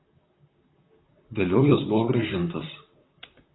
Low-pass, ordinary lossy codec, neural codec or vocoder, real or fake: 7.2 kHz; AAC, 16 kbps; vocoder, 44.1 kHz, 128 mel bands, Pupu-Vocoder; fake